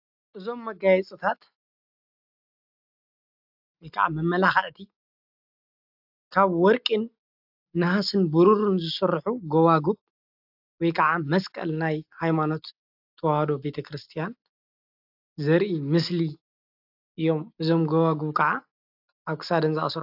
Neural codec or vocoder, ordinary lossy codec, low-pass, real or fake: none; AAC, 48 kbps; 5.4 kHz; real